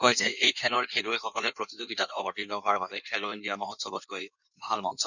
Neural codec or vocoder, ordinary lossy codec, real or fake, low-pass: codec, 16 kHz in and 24 kHz out, 1.1 kbps, FireRedTTS-2 codec; none; fake; 7.2 kHz